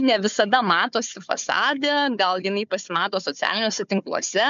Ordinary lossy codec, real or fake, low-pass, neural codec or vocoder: MP3, 64 kbps; fake; 7.2 kHz; codec, 16 kHz, 4 kbps, FunCodec, trained on Chinese and English, 50 frames a second